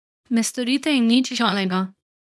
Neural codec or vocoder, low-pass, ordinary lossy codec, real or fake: codec, 24 kHz, 0.9 kbps, WavTokenizer, small release; none; none; fake